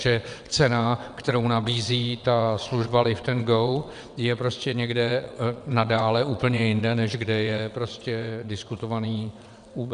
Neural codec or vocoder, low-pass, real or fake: vocoder, 22.05 kHz, 80 mel bands, WaveNeXt; 9.9 kHz; fake